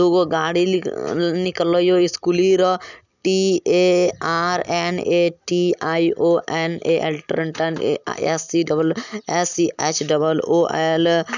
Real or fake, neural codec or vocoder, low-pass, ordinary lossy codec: real; none; 7.2 kHz; none